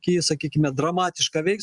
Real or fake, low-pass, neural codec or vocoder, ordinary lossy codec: real; 10.8 kHz; none; Opus, 64 kbps